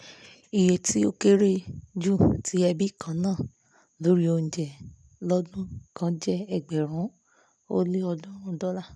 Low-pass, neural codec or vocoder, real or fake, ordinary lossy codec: none; none; real; none